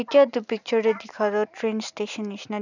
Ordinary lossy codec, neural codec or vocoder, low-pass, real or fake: none; none; 7.2 kHz; real